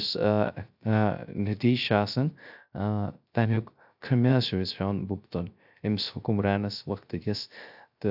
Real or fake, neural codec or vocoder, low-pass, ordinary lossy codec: fake; codec, 16 kHz, 0.3 kbps, FocalCodec; 5.4 kHz; AAC, 48 kbps